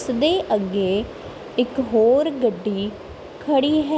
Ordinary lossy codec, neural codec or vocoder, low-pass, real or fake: none; none; none; real